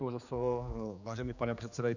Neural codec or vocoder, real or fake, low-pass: codec, 16 kHz, 2 kbps, X-Codec, HuBERT features, trained on general audio; fake; 7.2 kHz